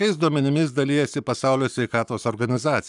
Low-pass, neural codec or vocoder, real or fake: 10.8 kHz; codec, 44.1 kHz, 7.8 kbps, Pupu-Codec; fake